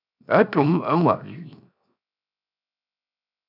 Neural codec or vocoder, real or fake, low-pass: codec, 16 kHz, 0.7 kbps, FocalCodec; fake; 5.4 kHz